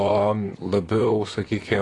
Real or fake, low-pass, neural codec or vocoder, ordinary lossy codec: fake; 10.8 kHz; vocoder, 44.1 kHz, 128 mel bands, Pupu-Vocoder; AAC, 32 kbps